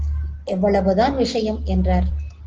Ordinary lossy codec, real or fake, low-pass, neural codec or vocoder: Opus, 16 kbps; real; 7.2 kHz; none